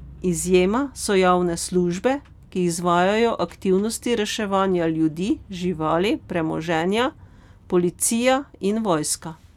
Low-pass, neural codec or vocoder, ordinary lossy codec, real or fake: 19.8 kHz; none; none; real